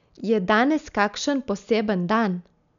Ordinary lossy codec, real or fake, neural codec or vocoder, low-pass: none; real; none; 7.2 kHz